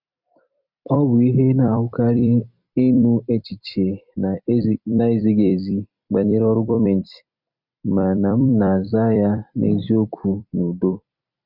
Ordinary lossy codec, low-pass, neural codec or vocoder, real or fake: none; 5.4 kHz; vocoder, 44.1 kHz, 128 mel bands every 256 samples, BigVGAN v2; fake